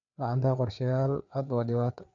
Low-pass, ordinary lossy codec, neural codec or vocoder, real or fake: 7.2 kHz; none; codec, 16 kHz, 4 kbps, FreqCodec, larger model; fake